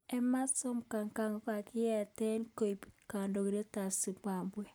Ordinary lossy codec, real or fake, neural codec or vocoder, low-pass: none; real; none; none